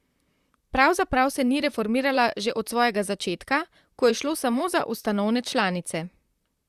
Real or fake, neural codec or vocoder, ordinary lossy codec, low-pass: fake; vocoder, 44.1 kHz, 128 mel bands, Pupu-Vocoder; Opus, 64 kbps; 14.4 kHz